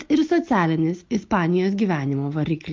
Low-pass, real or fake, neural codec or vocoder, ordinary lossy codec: 7.2 kHz; real; none; Opus, 32 kbps